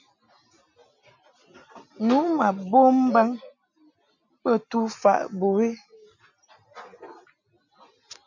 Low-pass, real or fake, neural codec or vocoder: 7.2 kHz; real; none